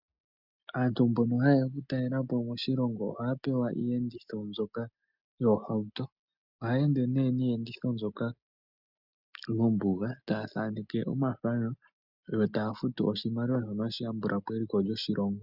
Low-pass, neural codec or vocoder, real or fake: 5.4 kHz; none; real